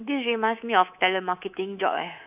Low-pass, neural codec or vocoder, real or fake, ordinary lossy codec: 3.6 kHz; codec, 24 kHz, 3.1 kbps, DualCodec; fake; none